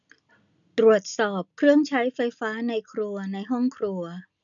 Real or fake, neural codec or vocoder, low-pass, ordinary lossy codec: real; none; 7.2 kHz; none